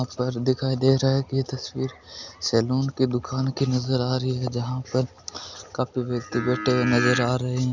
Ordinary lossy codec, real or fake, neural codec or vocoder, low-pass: none; real; none; 7.2 kHz